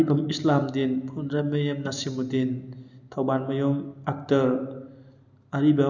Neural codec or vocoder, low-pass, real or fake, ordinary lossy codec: none; 7.2 kHz; real; none